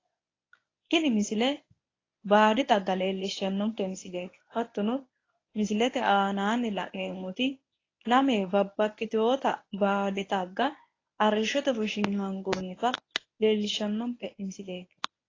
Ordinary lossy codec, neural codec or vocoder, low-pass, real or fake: AAC, 32 kbps; codec, 24 kHz, 0.9 kbps, WavTokenizer, medium speech release version 1; 7.2 kHz; fake